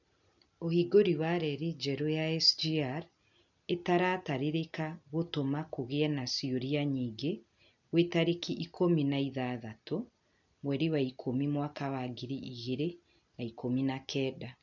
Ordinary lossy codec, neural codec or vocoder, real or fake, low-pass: none; none; real; 7.2 kHz